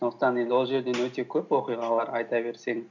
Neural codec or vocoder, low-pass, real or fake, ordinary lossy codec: none; 7.2 kHz; real; none